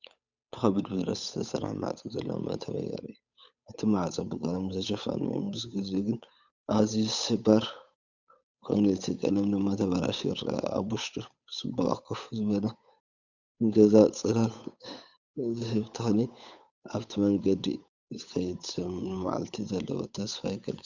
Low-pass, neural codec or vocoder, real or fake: 7.2 kHz; codec, 16 kHz, 8 kbps, FunCodec, trained on Chinese and English, 25 frames a second; fake